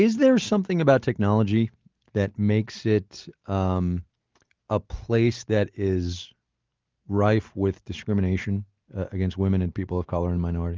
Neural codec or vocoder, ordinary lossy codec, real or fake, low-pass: none; Opus, 32 kbps; real; 7.2 kHz